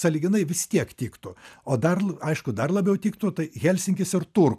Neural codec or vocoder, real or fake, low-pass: none; real; 14.4 kHz